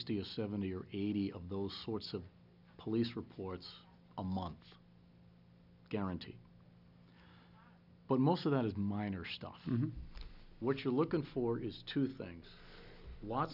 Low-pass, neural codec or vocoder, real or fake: 5.4 kHz; none; real